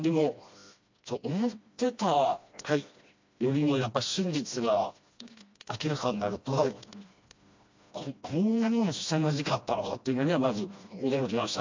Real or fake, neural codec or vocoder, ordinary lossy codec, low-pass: fake; codec, 16 kHz, 1 kbps, FreqCodec, smaller model; MP3, 48 kbps; 7.2 kHz